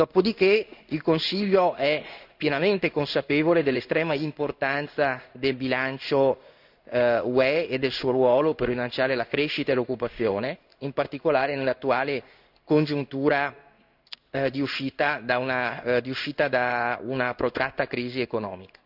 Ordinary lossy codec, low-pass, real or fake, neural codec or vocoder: none; 5.4 kHz; fake; codec, 16 kHz in and 24 kHz out, 1 kbps, XY-Tokenizer